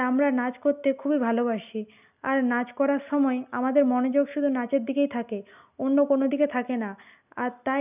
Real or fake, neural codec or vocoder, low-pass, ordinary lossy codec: real; none; 3.6 kHz; none